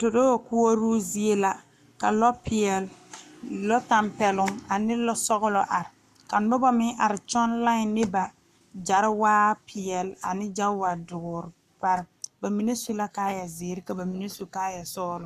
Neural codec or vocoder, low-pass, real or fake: codec, 44.1 kHz, 7.8 kbps, Pupu-Codec; 14.4 kHz; fake